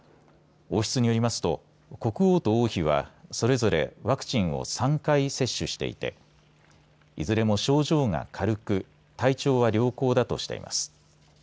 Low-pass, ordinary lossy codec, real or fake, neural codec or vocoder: none; none; real; none